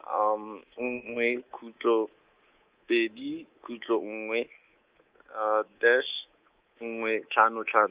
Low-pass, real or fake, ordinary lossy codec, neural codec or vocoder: 3.6 kHz; fake; Opus, 32 kbps; codec, 24 kHz, 3.1 kbps, DualCodec